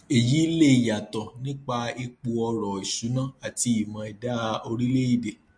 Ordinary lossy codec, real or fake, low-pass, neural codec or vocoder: MP3, 48 kbps; real; 9.9 kHz; none